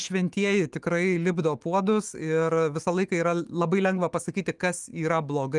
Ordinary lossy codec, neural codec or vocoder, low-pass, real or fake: Opus, 24 kbps; autoencoder, 48 kHz, 128 numbers a frame, DAC-VAE, trained on Japanese speech; 10.8 kHz; fake